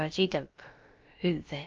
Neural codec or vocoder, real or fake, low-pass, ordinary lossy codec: codec, 16 kHz, about 1 kbps, DyCAST, with the encoder's durations; fake; 7.2 kHz; Opus, 24 kbps